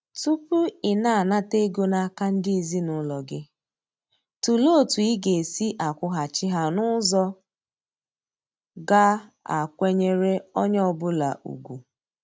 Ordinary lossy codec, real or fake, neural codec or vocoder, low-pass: none; real; none; none